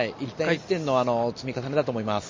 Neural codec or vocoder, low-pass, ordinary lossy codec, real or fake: none; 7.2 kHz; MP3, 32 kbps; real